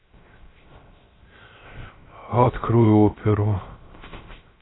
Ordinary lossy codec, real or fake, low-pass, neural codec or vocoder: AAC, 16 kbps; fake; 7.2 kHz; codec, 16 kHz, 0.3 kbps, FocalCodec